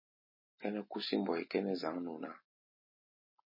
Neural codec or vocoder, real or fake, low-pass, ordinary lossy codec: none; real; 5.4 kHz; MP3, 24 kbps